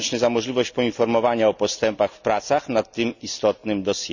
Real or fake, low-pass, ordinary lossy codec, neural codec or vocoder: real; 7.2 kHz; none; none